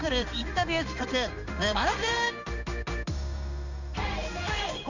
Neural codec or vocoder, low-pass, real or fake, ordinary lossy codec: codec, 16 kHz in and 24 kHz out, 1 kbps, XY-Tokenizer; 7.2 kHz; fake; none